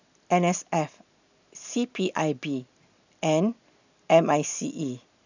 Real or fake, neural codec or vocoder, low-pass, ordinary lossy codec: real; none; 7.2 kHz; none